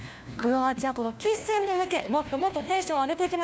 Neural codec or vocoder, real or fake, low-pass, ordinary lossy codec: codec, 16 kHz, 1 kbps, FunCodec, trained on LibriTTS, 50 frames a second; fake; none; none